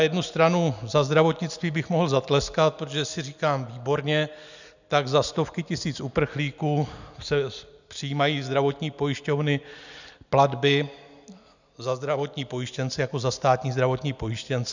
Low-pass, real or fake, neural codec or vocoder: 7.2 kHz; real; none